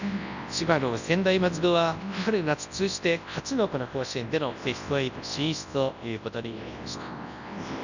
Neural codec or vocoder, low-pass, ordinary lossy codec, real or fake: codec, 24 kHz, 0.9 kbps, WavTokenizer, large speech release; 7.2 kHz; none; fake